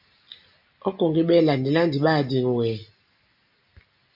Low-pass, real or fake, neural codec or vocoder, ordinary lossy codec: 5.4 kHz; real; none; MP3, 48 kbps